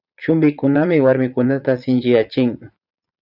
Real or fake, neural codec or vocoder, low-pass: fake; vocoder, 22.05 kHz, 80 mel bands, Vocos; 5.4 kHz